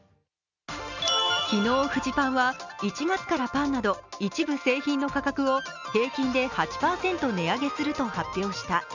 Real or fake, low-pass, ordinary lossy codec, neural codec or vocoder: real; 7.2 kHz; none; none